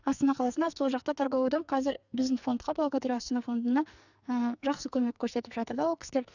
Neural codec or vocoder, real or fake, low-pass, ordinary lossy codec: codec, 44.1 kHz, 2.6 kbps, SNAC; fake; 7.2 kHz; none